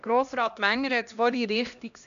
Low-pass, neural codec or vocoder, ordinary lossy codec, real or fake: 7.2 kHz; codec, 16 kHz, 1 kbps, X-Codec, HuBERT features, trained on LibriSpeech; none; fake